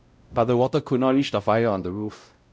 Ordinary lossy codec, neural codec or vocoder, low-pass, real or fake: none; codec, 16 kHz, 0.5 kbps, X-Codec, WavLM features, trained on Multilingual LibriSpeech; none; fake